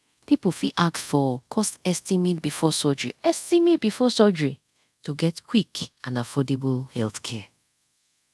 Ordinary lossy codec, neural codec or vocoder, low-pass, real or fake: none; codec, 24 kHz, 0.5 kbps, DualCodec; none; fake